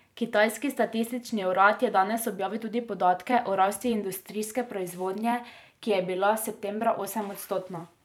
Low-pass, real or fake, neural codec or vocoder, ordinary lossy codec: 19.8 kHz; fake; vocoder, 44.1 kHz, 128 mel bands every 256 samples, BigVGAN v2; none